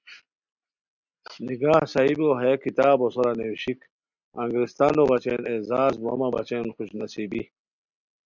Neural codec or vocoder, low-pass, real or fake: none; 7.2 kHz; real